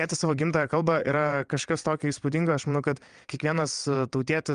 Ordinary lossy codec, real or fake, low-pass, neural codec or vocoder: Opus, 32 kbps; fake; 9.9 kHz; vocoder, 22.05 kHz, 80 mel bands, WaveNeXt